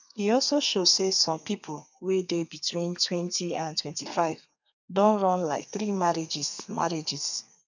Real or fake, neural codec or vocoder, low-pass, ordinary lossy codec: fake; codec, 32 kHz, 1.9 kbps, SNAC; 7.2 kHz; none